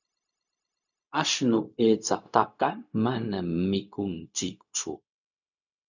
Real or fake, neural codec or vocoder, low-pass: fake; codec, 16 kHz, 0.4 kbps, LongCat-Audio-Codec; 7.2 kHz